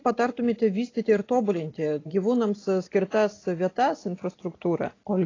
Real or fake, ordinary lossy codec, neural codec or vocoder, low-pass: real; AAC, 32 kbps; none; 7.2 kHz